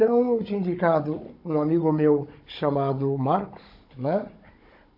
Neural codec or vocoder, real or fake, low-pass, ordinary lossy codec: codec, 16 kHz, 16 kbps, FunCodec, trained on Chinese and English, 50 frames a second; fake; 5.4 kHz; MP3, 32 kbps